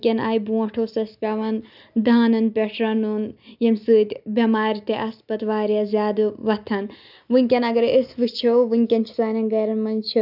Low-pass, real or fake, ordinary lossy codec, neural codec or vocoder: 5.4 kHz; real; none; none